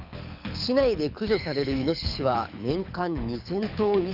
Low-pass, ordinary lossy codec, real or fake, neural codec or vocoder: 5.4 kHz; none; fake; codec, 24 kHz, 6 kbps, HILCodec